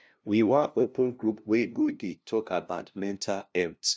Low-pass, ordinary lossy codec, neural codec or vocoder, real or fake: none; none; codec, 16 kHz, 0.5 kbps, FunCodec, trained on LibriTTS, 25 frames a second; fake